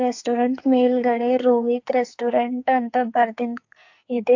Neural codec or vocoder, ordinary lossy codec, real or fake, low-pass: codec, 44.1 kHz, 2.6 kbps, SNAC; AAC, 48 kbps; fake; 7.2 kHz